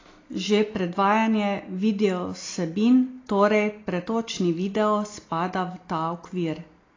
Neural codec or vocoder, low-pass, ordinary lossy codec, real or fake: none; 7.2 kHz; AAC, 32 kbps; real